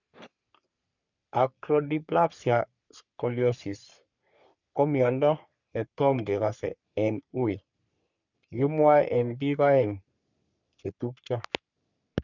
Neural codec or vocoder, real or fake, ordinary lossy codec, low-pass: codec, 44.1 kHz, 3.4 kbps, Pupu-Codec; fake; none; 7.2 kHz